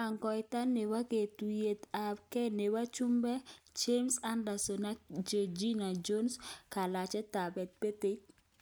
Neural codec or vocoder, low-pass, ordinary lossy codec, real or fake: none; none; none; real